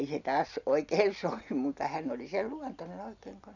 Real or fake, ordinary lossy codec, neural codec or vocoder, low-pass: real; none; none; 7.2 kHz